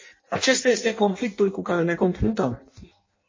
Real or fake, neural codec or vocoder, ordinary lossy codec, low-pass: fake; codec, 16 kHz in and 24 kHz out, 0.6 kbps, FireRedTTS-2 codec; MP3, 32 kbps; 7.2 kHz